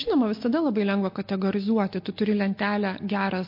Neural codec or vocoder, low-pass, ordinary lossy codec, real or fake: none; 5.4 kHz; MP3, 32 kbps; real